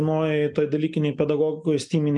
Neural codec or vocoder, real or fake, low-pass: none; real; 10.8 kHz